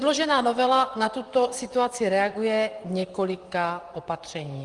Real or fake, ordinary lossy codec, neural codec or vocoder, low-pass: fake; Opus, 32 kbps; vocoder, 44.1 kHz, 128 mel bands, Pupu-Vocoder; 10.8 kHz